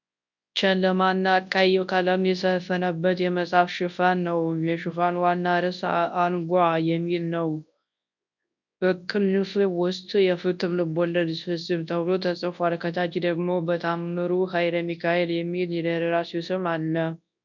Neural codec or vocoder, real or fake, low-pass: codec, 24 kHz, 0.9 kbps, WavTokenizer, large speech release; fake; 7.2 kHz